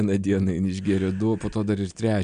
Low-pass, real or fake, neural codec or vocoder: 9.9 kHz; real; none